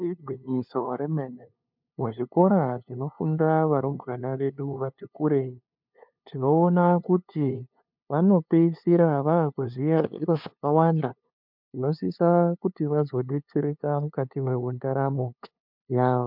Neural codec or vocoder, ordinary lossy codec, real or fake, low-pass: codec, 16 kHz, 2 kbps, FunCodec, trained on LibriTTS, 25 frames a second; MP3, 48 kbps; fake; 5.4 kHz